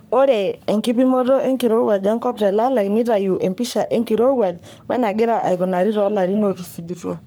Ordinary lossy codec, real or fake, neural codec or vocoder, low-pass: none; fake; codec, 44.1 kHz, 3.4 kbps, Pupu-Codec; none